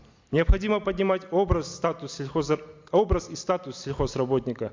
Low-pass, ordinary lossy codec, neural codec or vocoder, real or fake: 7.2 kHz; MP3, 64 kbps; none; real